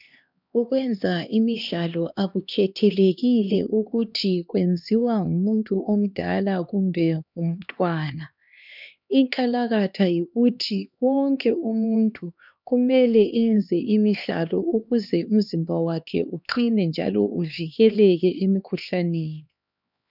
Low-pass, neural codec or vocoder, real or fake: 5.4 kHz; codec, 16 kHz, 2 kbps, X-Codec, HuBERT features, trained on LibriSpeech; fake